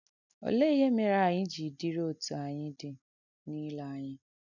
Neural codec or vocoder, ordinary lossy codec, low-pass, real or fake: none; none; 7.2 kHz; real